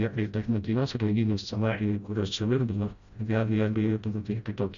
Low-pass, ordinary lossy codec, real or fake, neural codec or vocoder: 7.2 kHz; Opus, 64 kbps; fake; codec, 16 kHz, 0.5 kbps, FreqCodec, smaller model